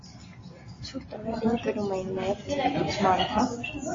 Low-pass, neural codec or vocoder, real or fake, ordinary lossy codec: 7.2 kHz; none; real; AAC, 32 kbps